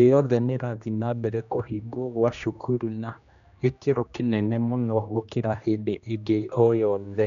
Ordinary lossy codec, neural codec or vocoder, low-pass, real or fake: none; codec, 16 kHz, 1 kbps, X-Codec, HuBERT features, trained on general audio; 7.2 kHz; fake